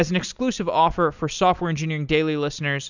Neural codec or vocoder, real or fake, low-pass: none; real; 7.2 kHz